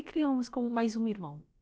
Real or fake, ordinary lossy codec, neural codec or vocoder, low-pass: fake; none; codec, 16 kHz, about 1 kbps, DyCAST, with the encoder's durations; none